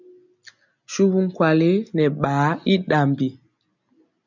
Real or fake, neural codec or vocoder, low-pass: real; none; 7.2 kHz